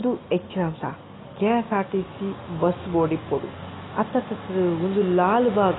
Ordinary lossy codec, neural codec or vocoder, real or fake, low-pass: AAC, 16 kbps; none; real; 7.2 kHz